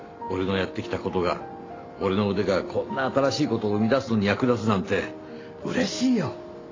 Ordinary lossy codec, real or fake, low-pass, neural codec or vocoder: AAC, 32 kbps; real; 7.2 kHz; none